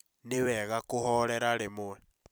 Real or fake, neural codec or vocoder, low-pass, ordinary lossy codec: fake; vocoder, 44.1 kHz, 128 mel bands every 256 samples, BigVGAN v2; none; none